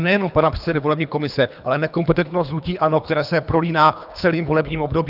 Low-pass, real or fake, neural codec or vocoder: 5.4 kHz; fake; codec, 24 kHz, 3 kbps, HILCodec